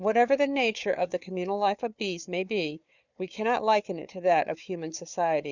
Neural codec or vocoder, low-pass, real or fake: codec, 16 kHz, 4 kbps, FunCodec, trained on Chinese and English, 50 frames a second; 7.2 kHz; fake